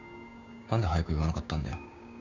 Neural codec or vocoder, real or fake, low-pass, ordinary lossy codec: codec, 16 kHz, 6 kbps, DAC; fake; 7.2 kHz; none